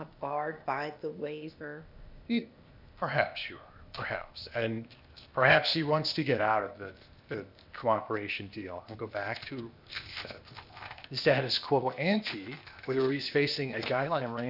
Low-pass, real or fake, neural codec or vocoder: 5.4 kHz; fake; codec, 16 kHz, 0.8 kbps, ZipCodec